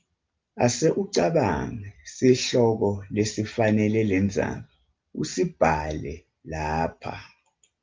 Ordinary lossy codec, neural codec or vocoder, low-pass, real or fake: Opus, 24 kbps; none; 7.2 kHz; real